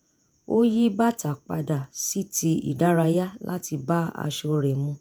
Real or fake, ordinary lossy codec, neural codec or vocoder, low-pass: fake; none; vocoder, 48 kHz, 128 mel bands, Vocos; none